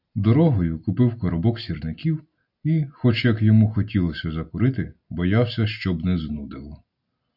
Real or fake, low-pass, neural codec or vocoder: real; 5.4 kHz; none